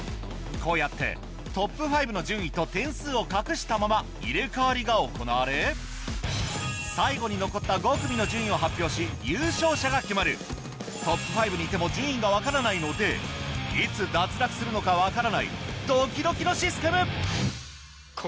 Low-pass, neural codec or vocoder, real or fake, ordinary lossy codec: none; none; real; none